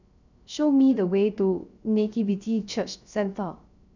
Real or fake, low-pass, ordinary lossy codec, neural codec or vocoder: fake; 7.2 kHz; none; codec, 16 kHz, 0.3 kbps, FocalCodec